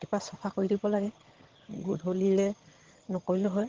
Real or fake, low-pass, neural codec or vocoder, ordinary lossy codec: fake; 7.2 kHz; vocoder, 22.05 kHz, 80 mel bands, HiFi-GAN; Opus, 16 kbps